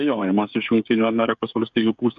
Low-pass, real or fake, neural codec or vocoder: 7.2 kHz; fake; codec, 16 kHz, 8 kbps, FreqCodec, smaller model